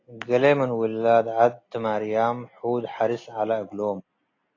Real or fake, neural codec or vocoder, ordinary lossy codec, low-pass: real; none; AAC, 32 kbps; 7.2 kHz